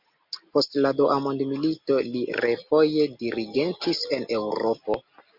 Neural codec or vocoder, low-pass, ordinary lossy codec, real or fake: none; 5.4 kHz; MP3, 48 kbps; real